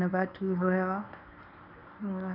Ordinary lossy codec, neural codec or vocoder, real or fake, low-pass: none; codec, 24 kHz, 0.9 kbps, WavTokenizer, medium speech release version 1; fake; 5.4 kHz